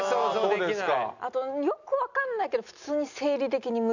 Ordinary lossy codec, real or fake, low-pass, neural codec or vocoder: none; real; 7.2 kHz; none